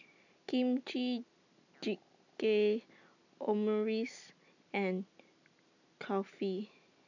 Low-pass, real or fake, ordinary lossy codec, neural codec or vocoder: 7.2 kHz; real; none; none